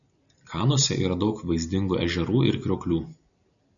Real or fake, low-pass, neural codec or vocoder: real; 7.2 kHz; none